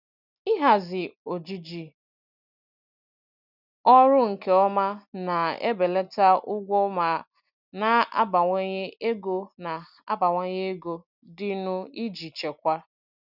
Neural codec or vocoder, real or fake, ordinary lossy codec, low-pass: none; real; none; 5.4 kHz